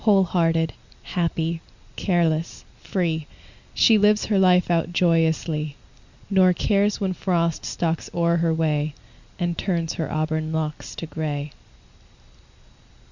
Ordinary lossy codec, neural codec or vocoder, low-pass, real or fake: Opus, 64 kbps; none; 7.2 kHz; real